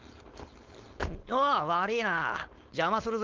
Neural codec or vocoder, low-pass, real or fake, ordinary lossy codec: codec, 16 kHz, 4.8 kbps, FACodec; 7.2 kHz; fake; Opus, 24 kbps